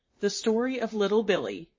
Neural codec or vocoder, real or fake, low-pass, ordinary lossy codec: vocoder, 44.1 kHz, 128 mel bands, Pupu-Vocoder; fake; 7.2 kHz; MP3, 32 kbps